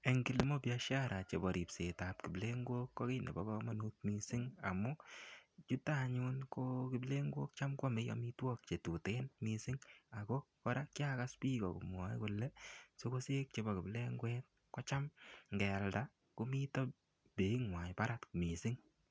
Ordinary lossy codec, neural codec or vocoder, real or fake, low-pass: none; none; real; none